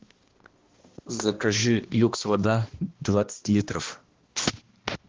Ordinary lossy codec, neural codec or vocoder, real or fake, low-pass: Opus, 16 kbps; codec, 16 kHz, 1 kbps, X-Codec, HuBERT features, trained on balanced general audio; fake; 7.2 kHz